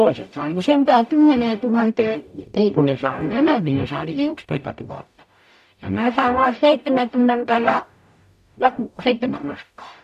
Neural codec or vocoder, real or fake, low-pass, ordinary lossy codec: codec, 44.1 kHz, 0.9 kbps, DAC; fake; 14.4 kHz; none